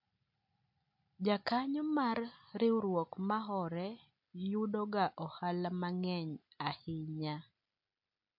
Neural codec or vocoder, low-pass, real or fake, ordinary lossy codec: none; 5.4 kHz; real; MP3, 48 kbps